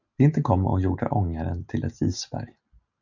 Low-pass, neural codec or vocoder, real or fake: 7.2 kHz; none; real